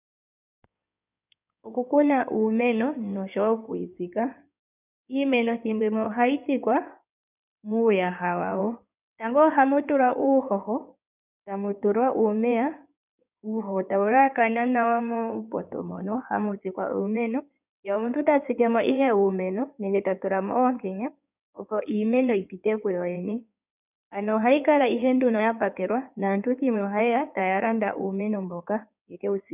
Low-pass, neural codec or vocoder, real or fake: 3.6 kHz; codec, 16 kHz in and 24 kHz out, 2.2 kbps, FireRedTTS-2 codec; fake